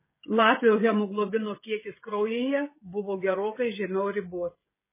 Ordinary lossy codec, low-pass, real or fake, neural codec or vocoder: MP3, 16 kbps; 3.6 kHz; fake; codec, 16 kHz, 16 kbps, FreqCodec, smaller model